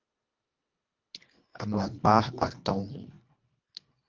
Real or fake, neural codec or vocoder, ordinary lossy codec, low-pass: fake; codec, 24 kHz, 1.5 kbps, HILCodec; Opus, 24 kbps; 7.2 kHz